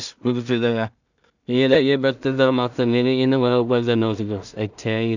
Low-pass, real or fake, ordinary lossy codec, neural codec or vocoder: 7.2 kHz; fake; none; codec, 16 kHz in and 24 kHz out, 0.4 kbps, LongCat-Audio-Codec, two codebook decoder